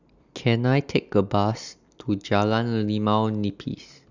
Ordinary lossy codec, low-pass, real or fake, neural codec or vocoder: Opus, 64 kbps; 7.2 kHz; real; none